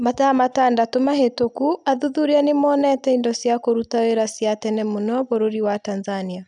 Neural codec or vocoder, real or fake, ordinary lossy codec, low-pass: none; real; none; 10.8 kHz